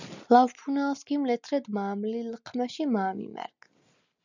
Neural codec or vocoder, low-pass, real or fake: none; 7.2 kHz; real